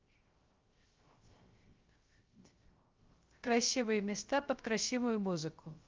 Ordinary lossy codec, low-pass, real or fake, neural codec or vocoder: Opus, 32 kbps; 7.2 kHz; fake; codec, 16 kHz, 0.3 kbps, FocalCodec